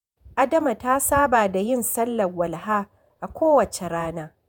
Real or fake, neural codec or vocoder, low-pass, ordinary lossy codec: fake; vocoder, 48 kHz, 128 mel bands, Vocos; none; none